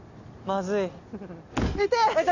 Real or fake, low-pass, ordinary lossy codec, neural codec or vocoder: real; 7.2 kHz; none; none